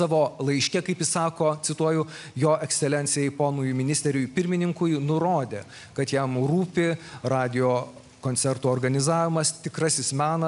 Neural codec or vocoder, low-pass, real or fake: none; 10.8 kHz; real